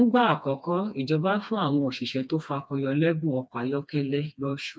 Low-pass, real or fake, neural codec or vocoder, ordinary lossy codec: none; fake; codec, 16 kHz, 2 kbps, FreqCodec, smaller model; none